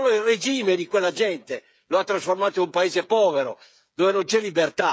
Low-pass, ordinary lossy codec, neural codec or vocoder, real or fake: none; none; codec, 16 kHz, 8 kbps, FreqCodec, smaller model; fake